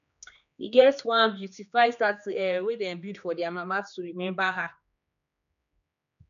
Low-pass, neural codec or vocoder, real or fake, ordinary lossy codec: 7.2 kHz; codec, 16 kHz, 2 kbps, X-Codec, HuBERT features, trained on general audio; fake; none